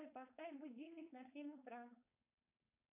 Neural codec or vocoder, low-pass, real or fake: codec, 16 kHz, 4.8 kbps, FACodec; 3.6 kHz; fake